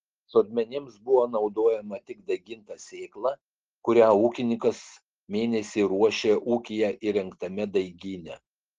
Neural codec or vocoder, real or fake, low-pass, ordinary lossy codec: none; real; 7.2 kHz; Opus, 16 kbps